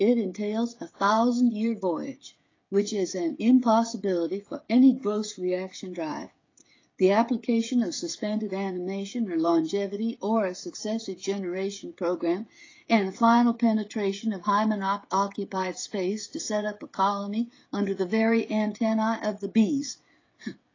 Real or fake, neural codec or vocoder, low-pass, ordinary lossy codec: fake; codec, 16 kHz, 16 kbps, FreqCodec, smaller model; 7.2 kHz; AAC, 32 kbps